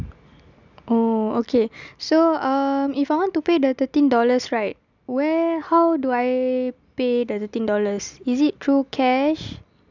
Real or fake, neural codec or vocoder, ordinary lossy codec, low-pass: real; none; none; 7.2 kHz